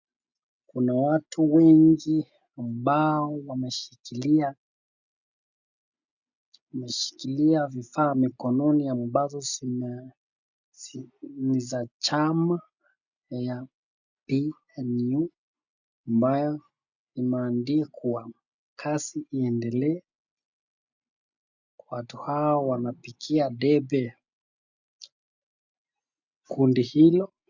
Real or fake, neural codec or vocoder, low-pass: real; none; 7.2 kHz